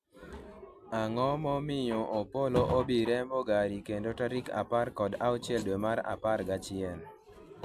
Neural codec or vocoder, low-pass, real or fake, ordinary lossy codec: none; 14.4 kHz; real; AAC, 96 kbps